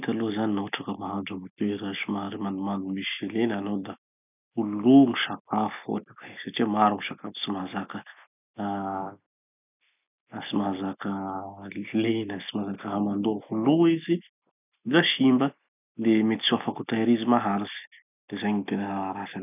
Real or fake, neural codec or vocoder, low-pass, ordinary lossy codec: real; none; 3.6 kHz; none